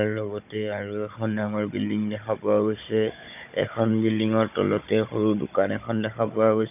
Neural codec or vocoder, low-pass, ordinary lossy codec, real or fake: codec, 16 kHz, 16 kbps, FunCodec, trained on Chinese and English, 50 frames a second; 3.6 kHz; none; fake